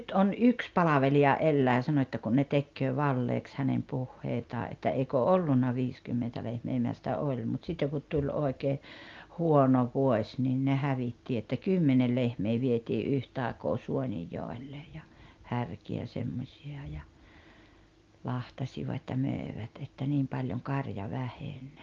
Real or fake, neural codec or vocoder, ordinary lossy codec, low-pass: real; none; Opus, 32 kbps; 7.2 kHz